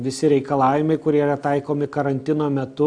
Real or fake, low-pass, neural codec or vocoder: real; 9.9 kHz; none